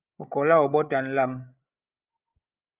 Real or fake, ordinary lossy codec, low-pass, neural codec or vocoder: fake; Opus, 32 kbps; 3.6 kHz; codec, 16 kHz, 16 kbps, FreqCodec, larger model